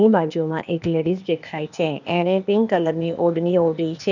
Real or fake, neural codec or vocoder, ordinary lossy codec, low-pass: fake; codec, 16 kHz, 0.8 kbps, ZipCodec; none; 7.2 kHz